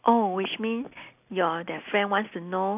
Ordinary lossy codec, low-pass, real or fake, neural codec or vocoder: none; 3.6 kHz; real; none